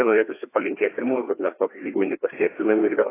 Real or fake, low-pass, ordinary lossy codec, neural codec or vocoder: fake; 3.6 kHz; AAC, 16 kbps; codec, 16 kHz, 2 kbps, FreqCodec, larger model